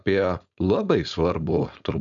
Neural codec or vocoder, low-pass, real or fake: codec, 16 kHz, 4.8 kbps, FACodec; 7.2 kHz; fake